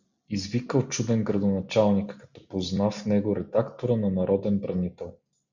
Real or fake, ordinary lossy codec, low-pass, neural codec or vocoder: real; Opus, 64 kbps; 7.2 kHz; none